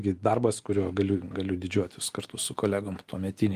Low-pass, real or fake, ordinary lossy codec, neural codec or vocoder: 14.4 kHz; real; Opus, 32 kbps; none